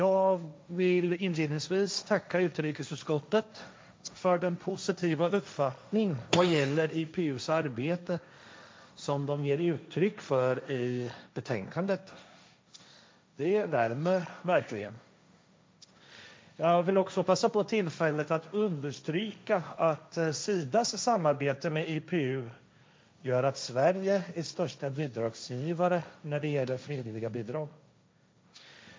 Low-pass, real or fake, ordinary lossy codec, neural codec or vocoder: none; fake; none; codec, 16 kHz, 1.1 kbps, Voila-Tokenizer